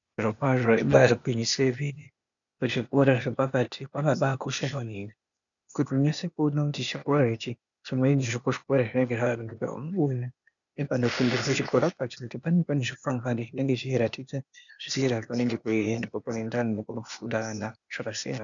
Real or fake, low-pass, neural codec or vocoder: fake; 7.2 kHz; codec, 16 kHz, 0.8 kbps, ZipCodec